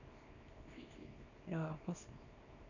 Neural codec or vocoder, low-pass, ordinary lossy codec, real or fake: codec, 24 kHz, 0.9 kbps, WavTokenizer, small release; 7.2 kHz; none; fake